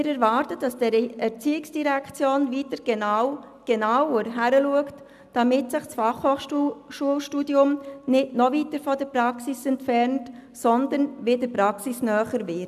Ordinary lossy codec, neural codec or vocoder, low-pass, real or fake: none; none; 14.4 kHz; real